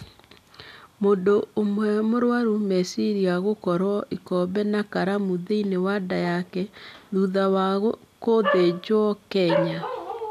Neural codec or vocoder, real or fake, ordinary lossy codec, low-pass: none; real; none; 14.4 kHz